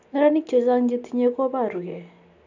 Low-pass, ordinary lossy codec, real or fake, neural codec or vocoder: 7.2 kHz; AAC, 48 kbps; real; none